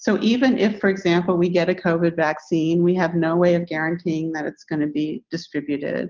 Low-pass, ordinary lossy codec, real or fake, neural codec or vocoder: 7.2 kHz; Opus, 32 kbps; real; none